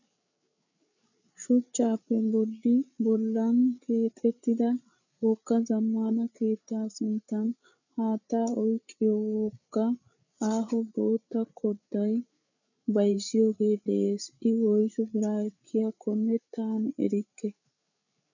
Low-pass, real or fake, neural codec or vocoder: 7.2 kHz; fake; codec, 16 kHz, 8 kbps, FreqCodec, larger model